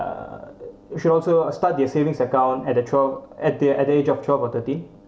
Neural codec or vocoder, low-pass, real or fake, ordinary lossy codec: none; none; real; none